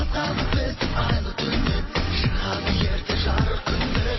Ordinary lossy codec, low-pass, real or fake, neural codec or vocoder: MP3, 24 kbps; 7.2 kHz; fake; vocoder, 22.05 kHz, 80 mel bands, WaveNeXt